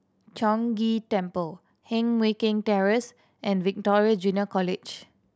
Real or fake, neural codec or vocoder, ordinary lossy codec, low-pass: real; none; none; none